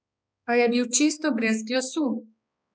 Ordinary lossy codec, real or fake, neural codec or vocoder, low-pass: none; fake; codec, 16 kHz, 2 kbps, X-Codec, HuBERT features, trained on balanced general audio; none